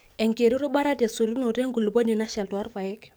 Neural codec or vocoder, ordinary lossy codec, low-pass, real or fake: codec, 44.1 kHz, 7.8 kbps, DAC; none; none; fake